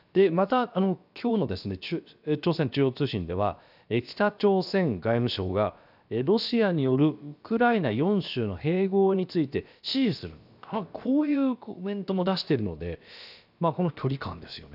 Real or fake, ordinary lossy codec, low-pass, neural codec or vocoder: fake; none; 5.4 kHz; codec, 16 kHz, about 1 kbps, DyCAST, with the encoder's durations